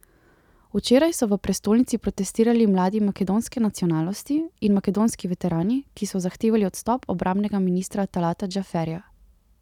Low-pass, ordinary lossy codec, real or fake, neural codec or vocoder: 19.8 kHz; none; real; none